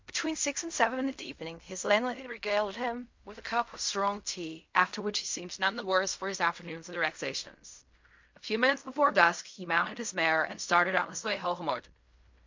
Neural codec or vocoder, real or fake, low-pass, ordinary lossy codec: codec, 16 kHz in and 24 kHz out, 0.4 kbps, LongCat-Audio-Codec, fine tuned four codebook decoder; fake; 7.2 kHz; MP3, 48 kbps